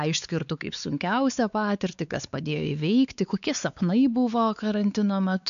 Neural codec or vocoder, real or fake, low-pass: codec, 16 kHz, 4 kbps, X-Codec, WavLM features, trained on Multilingual LibriSpeech; fake; 7.2 kHz